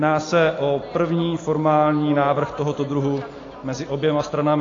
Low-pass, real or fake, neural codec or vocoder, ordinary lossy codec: 7.2 kHz; real; none; AAC, 32 kbps